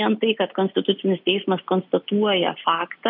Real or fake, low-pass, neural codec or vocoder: real; 5.4 kHz; none